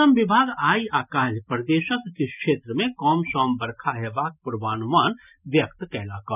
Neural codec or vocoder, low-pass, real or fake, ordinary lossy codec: none; 3.6 kHz; real; none